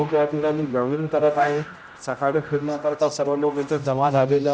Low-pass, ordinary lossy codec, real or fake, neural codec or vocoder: none; none; fake; codec, 16 kHz, 0.5 kbps, X-Codec, HuBERT features, trained on general audio